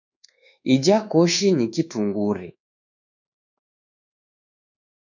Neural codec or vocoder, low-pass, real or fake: codec, 24 kHz, 1.2 kbps, DualCodec; 7.2 kHz; fake